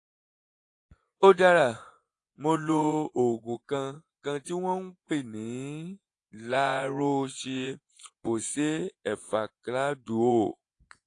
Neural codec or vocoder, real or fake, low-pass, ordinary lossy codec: vocoder, 24 kHz, 100 mel bands, Vocos; fake; 10.8 kHz; AAC, 48 kbps